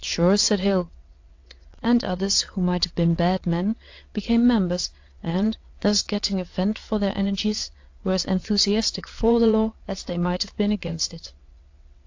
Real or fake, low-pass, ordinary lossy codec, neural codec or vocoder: fake; 7.2 kHz; AAC, 48 kbps; vocoder, 22.05 kHz, 80 mel bands, WaveNeXt